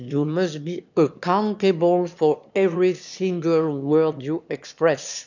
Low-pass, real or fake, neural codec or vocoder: 7.2 kHz; fake; autoencoder, 22.05 kHz, a latent of 192 numbers a frame, VITS, trained on one speaker